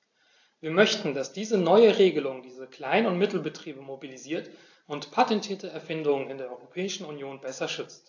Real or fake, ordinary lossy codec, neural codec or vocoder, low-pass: real; AAC, 48 kbps; none; 7.2 kHz